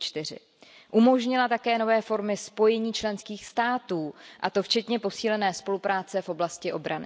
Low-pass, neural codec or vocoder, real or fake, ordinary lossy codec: none; none; real; none